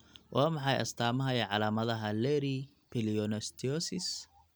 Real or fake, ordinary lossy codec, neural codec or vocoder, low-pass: real; none; none; none